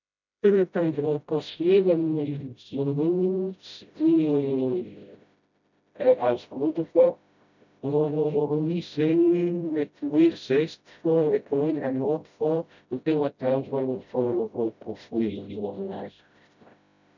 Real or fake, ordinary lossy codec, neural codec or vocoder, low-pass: fake; none; codec, 16 kHz, 0.5 kbps, FreqCodec, smaller model; 7.2 kHz